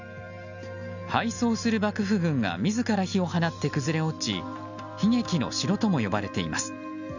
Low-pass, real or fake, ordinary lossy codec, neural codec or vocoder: 7.2 kHz; real; none; none